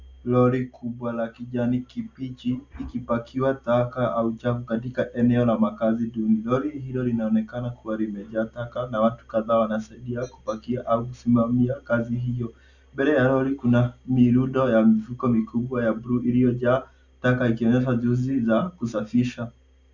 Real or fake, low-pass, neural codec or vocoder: real; 7.2 kHz; none